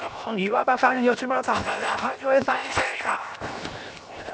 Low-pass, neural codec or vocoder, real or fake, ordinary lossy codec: none; codec, 16 kHz, 0.7 kbps, FocalCodec; fake; none